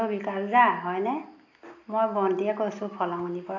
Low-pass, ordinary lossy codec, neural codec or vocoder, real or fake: 7.2 kHz; AAC, 48 kbps; none; real